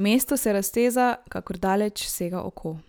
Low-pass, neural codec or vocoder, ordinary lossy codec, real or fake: none; none; none; real